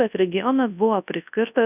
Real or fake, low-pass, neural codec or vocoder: fake; 3.6 kHz; codec, 24 kHz, 0.9 kbps, WavTokenizer, large speech release